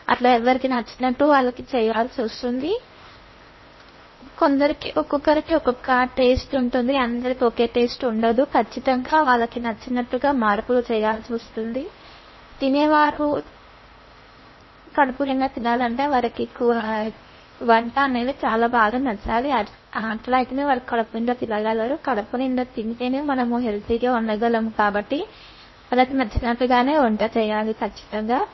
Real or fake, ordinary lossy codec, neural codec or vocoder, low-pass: fake; MP3, 24 kbps; codec, 16 kHz in and 24 kHz out, 0.8 kbps, FocalCodec, streaming, 65536 codes; 7.2 kHz